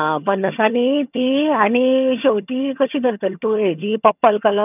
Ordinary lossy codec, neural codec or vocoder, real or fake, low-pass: none; vocoder, 22.05 kHz, 80 mel bands, HiFi-GAN; fake; 3.6 kHz